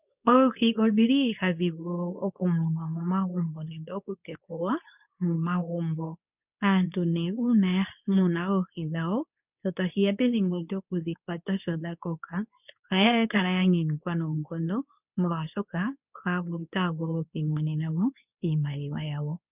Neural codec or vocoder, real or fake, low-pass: codec, 24 kHz, 0.9 kbps, WavTokenizer, medium speech release version 2; fake; 3.6 kHz